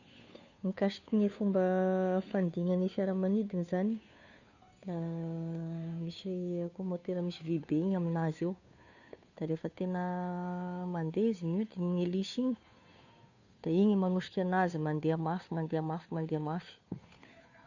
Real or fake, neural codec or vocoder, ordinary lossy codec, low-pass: fake; codec, 16 kHz, 2 kbps, FunCodec, trained on Chinese and English, 25 frames a second; none; 7.2 kHz